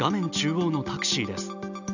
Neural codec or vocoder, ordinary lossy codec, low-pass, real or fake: none; none; 7.2 kHz; real